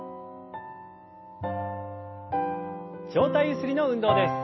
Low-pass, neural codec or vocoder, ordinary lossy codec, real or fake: 7.2 kHz; none; MP3, 24 kbps; real